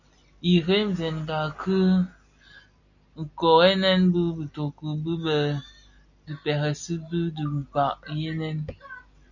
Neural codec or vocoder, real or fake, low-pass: none; real; 7.2 kHz